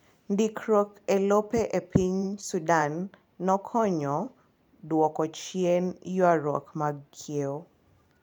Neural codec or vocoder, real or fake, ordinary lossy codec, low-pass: vocoder, 44.1 kHz, 128 mel bands every 512 samples, BigVGAN v2; fake; none; 19.8 kHz